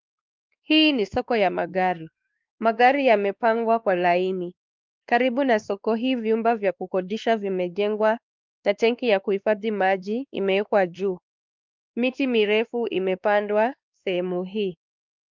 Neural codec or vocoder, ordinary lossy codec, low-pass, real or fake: codec, 16 kHz, 2 kbps, X-Codec, WavLM features, trained on Multilingual LibriSpeech; Opus, 24 kbps; 7.2 kHz; fake